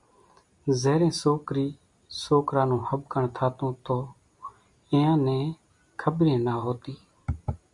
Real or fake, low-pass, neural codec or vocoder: real; 10.8 kHz; none